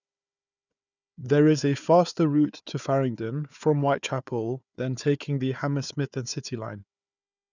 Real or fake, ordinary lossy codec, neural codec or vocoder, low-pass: fake; none; codec, 16 kHz, 4 kbps, FunCodec, trained on Chinese and English, 50 frames a second; 7.2 kHz